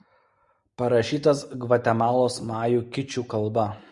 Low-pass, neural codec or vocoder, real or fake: 10.8 kHz; none; real